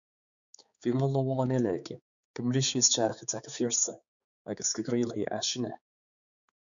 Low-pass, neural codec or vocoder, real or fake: 7.2 kHz; codec, 16 kHz, 4 kbps, X-Codec, HuBERT features, trained on balanced general audio; fake